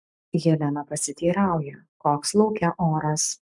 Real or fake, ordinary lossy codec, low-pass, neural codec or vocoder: fake; MP3, 96 kbps; 10.8 kHz; codec, 44.1 kHz, 7.8 kbps, Pupu-Codec